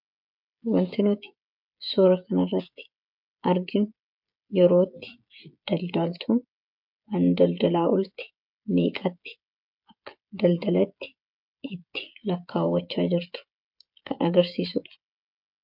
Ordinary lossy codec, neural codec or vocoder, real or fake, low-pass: MP3, 48 kbps; codec, 16 kHz, 16 kbps, FreqCodec, smaller model; fake; 5.4 kHz